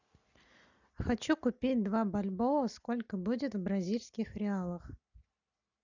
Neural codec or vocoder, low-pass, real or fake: none; 7.2 kHz; real